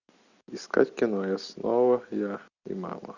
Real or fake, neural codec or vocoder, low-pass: real; none; 7.2 kHz